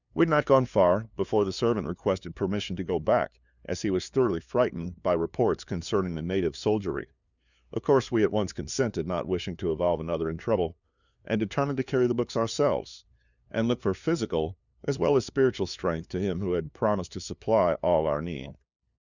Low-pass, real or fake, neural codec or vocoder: 7.2 kHz; fake; codec, 16 kHz, 2 kbps, FunCodec, trained on LibriTTS, 25 frames a second